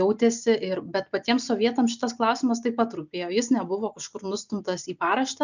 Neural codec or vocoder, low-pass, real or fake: none; 7.2 kHz; real